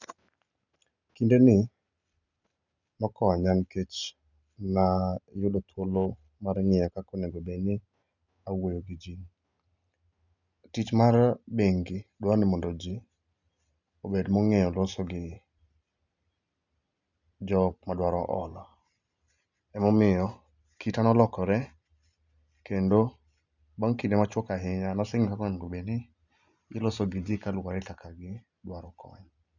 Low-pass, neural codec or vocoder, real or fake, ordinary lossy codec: 7.2 kHz; none; real; none